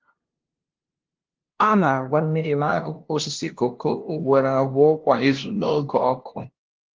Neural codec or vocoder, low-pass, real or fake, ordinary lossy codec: codec, 16 kHz, 0.5 kbps, FunCodec, trained on LibriTTS, 25 frames a second; 7.2 kHz; fake; Opus, 16 kbps